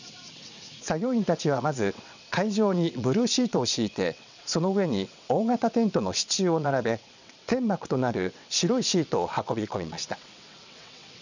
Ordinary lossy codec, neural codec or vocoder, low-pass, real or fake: none; vocoder, 22.05 kHz, 80 mel bands, WaveNeXt; 7.2 kHz; fake